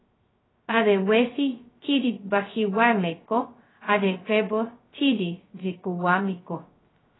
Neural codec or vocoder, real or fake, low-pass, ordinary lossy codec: codec, 16 kHz, 0.2 kbps, FocalCodec; fake; 7.2 kHz; AAC, 16 kbps